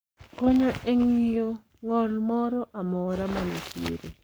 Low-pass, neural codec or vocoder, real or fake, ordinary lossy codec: none; codec, 44.1 kHz, 7.8 kbps, Pupu-Codec; fake; none